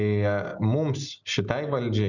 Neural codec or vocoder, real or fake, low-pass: none; real; 7.2 kHz